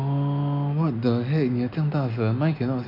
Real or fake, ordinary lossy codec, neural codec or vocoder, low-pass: real; none; none; 5.4 kHz